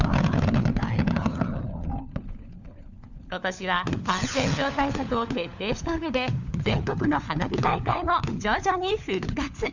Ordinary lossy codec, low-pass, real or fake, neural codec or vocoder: none; 7.2 kHz; fake; codec, 16 kHz, 4 kbps, FunCodec, trained on LibriTTS, 50 frames a second